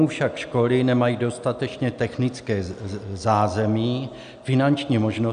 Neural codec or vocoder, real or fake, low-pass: none; real; 9.9 kHz